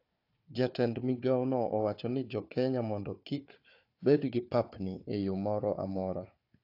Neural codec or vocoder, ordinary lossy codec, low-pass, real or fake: codec, 16 kHz, 4 kbps, FunCodec, trained on Chinese and English, 50 frames a second; AAC, 32 kbps; 5.4 kHz; fake